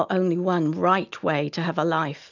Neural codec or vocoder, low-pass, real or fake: none; 7.2 kHz; real